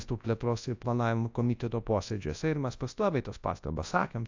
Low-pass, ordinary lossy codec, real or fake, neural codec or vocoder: 7.2 kHz; AAC, 48 kbps; fake; codec, 24 kHz, 0.9 kbps, WavTokenizer, large speech release